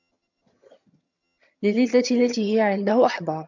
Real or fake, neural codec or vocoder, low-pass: fake; vocoder, 22.05 kHz, 80 mel bands, HiFi-GAN; 7.2 kHz